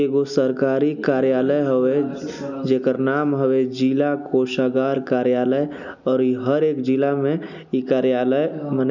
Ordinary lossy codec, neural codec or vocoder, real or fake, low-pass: none; none; real; 7.2 kHz